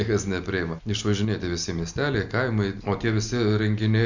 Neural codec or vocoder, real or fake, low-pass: none; real; 7.2 kHz